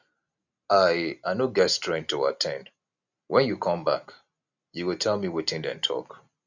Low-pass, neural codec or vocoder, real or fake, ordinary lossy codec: 7.2 kHz; none; real; none